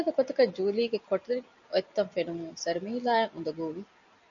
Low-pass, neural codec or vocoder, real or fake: 7.2 kHz; none; real